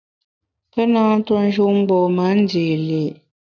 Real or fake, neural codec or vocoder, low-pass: real; none; 7.2 kHz